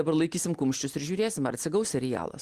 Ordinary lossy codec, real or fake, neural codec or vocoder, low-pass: Opus, 24 kbps; real; none; 14.4 kHz